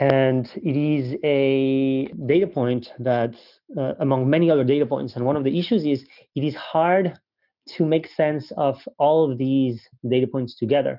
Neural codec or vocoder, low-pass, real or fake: none; 5.4 kHz; real